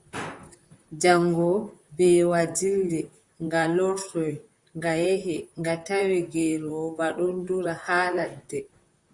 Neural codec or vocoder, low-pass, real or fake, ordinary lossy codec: vocoder, 44.1 kHz, 128 mel bands, Pupu-Vocoder; 10.8 kHz; fake; Opus, 64 kbps